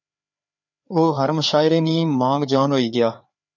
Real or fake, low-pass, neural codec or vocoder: fake; 7.2 kHz; codec, 16 kHz, 4 kbps, FreqCodec, larger model